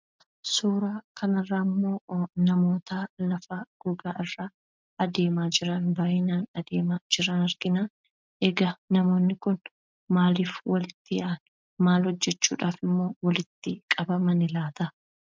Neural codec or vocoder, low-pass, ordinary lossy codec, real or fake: none; 7.2 kHz; MP3, 64 kbps; real